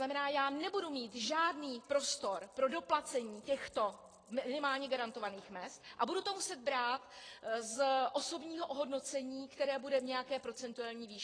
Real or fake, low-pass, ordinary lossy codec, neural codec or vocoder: real; 9.9 kHz; AAC, 32 kbps; none